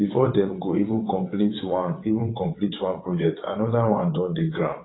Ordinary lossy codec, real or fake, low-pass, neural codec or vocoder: AAC, 16 kbps; fake; 7.2 kHz; vocoder, 22.05 kHz, 80 mel bands, WaveNeXt